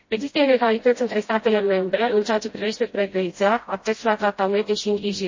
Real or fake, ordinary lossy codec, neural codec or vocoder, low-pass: fake; MP3, 32 kbps; codec, 16 kHz, 0.5 kbps, FreqCodec, smaller model; 7.2 kHz